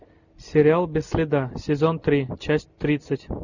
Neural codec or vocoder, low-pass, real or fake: none; 7.2 kHz; real